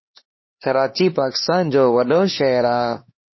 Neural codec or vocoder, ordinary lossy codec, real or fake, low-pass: codec, 16 kHz, 2 kbps, X-Codec, HuBERT features, trained on LibriSpeech; MP3, 24 kbps; fake; 7.2 kHz